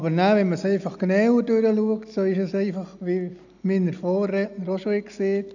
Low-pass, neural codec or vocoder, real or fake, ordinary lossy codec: 7.2 kHz; none; real; none